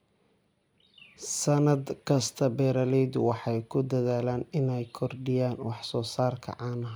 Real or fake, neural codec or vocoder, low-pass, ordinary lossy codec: real; none; none; none